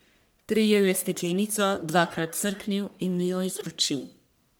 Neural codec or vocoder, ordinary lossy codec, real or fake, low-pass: codec, 44.1 kHz, 1.7 kbps, Pupu-Codec; none; fake; none